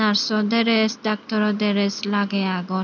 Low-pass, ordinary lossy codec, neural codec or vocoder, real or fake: 7.2 kHz; none; none; real